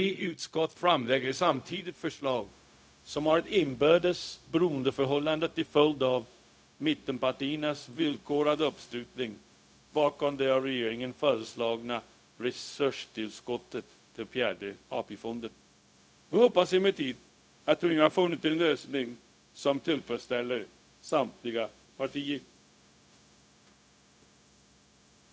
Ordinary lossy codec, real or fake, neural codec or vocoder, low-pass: none; fake; codec, 16 kHz, 0.4 kbps, LongCat-Audio-Codec; none